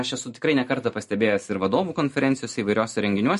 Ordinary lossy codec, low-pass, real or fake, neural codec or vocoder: MP3, 48 kbps; 14.4 kHz; real; none